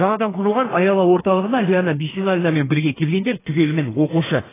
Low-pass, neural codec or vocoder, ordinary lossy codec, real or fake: 3.6 kHz; codec, 32 kHz, 1.9 kbps, SNAC; AAC, 16 kbps; fake